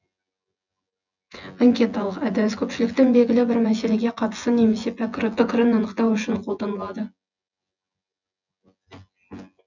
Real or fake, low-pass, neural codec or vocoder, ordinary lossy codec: fake; 7.2 kHz; vocoder, 24 kHz, 100 mel bands, Vocos; none